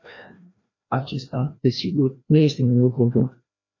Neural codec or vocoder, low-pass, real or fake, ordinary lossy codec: codec, 16 kHz, 1 kbps, FreqCodec, larger model; 7.2 kHz; fake; AAC, 32 kbps